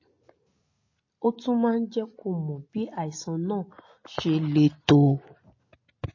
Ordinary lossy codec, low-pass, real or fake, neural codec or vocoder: MP3, 32 kbps; 7.2 kHz; real; none